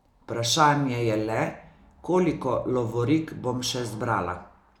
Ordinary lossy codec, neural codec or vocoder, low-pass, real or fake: none; none; 19.8 kHz; real